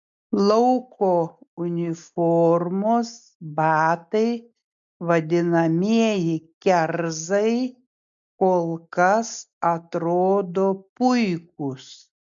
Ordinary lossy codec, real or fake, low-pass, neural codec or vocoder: MP3, 64 kbps; real; 7.2 kHz; none